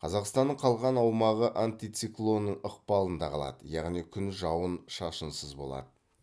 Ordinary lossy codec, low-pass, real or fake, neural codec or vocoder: none; none; real; none